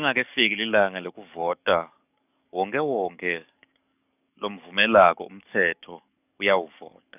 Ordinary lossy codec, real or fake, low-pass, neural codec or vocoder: none; fake; 3.6 kHz; codec, 44.1 kHz, 7.8 kbps, DAC